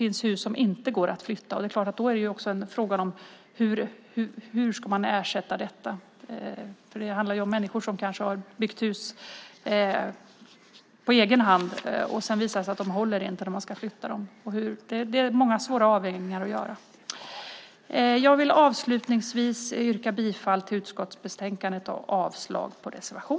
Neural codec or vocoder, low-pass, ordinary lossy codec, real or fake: none; none; none; real